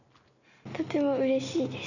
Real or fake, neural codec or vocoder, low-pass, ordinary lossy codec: real; none; 7.2 kHz; none